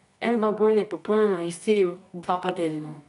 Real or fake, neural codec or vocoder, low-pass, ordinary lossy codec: fake; codec, 24 kHz, 0.9 kbps, WavTokenizer, medium music audio release; 10.8 kHz; none